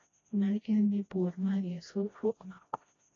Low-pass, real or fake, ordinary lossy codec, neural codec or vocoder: 7.2 kHz; fake; AAC, 32 kbps; codec, 16 kHz, 1 kbps, FreqCodec, smaller model